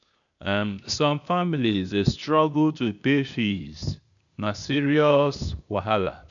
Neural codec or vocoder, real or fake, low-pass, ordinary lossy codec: codec, 16 kHz, 0.8 kbps, ZipCodec; fake; 7.2 kHz; none